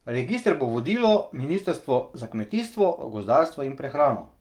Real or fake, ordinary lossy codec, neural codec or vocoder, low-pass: fake; Opus, 32 kbps; codec, 44.1 kHz, 7.8 kbps, DAC; 19.8 kHz